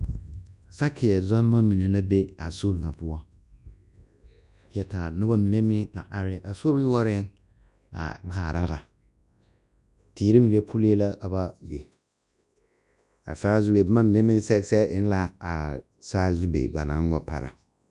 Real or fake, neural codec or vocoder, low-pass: fake; codec, 24 kHz, 0.9 kbps, WavTokenizer, large speech release; 10.8 kHz